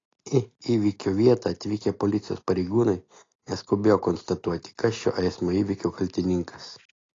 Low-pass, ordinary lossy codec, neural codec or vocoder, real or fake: 7.2 kHz; AAC, 32 kbps; none; real